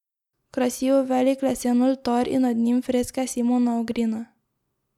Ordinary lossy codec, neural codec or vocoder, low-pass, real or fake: none; none; 19.8 kHz; real